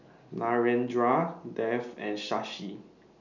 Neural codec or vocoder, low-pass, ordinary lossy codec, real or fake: none; 7.2 kHz; none; real